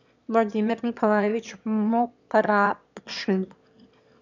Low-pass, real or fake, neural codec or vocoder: 7.2 kHz; fake; autoencoder, 22.05 kHz, a latent of 192 numbers a frame, VITS, trained on one speaker